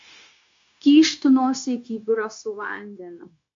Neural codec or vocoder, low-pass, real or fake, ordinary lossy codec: codec, 16 kHz, 0.9 kbps, LongCat-Audio-Codec; 7.2 kHz; fake; MP3, 48 kbps